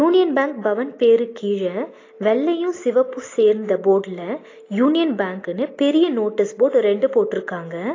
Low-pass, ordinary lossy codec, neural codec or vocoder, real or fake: 7.2 kHz; AAC, 32 kbps; none; real